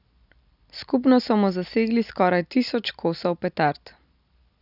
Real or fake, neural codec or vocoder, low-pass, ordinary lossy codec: real; none; 5.4 kHz; none